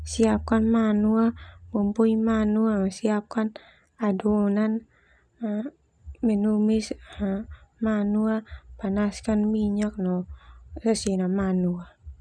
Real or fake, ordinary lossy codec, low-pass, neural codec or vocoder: real; none; 9.9 kHz; none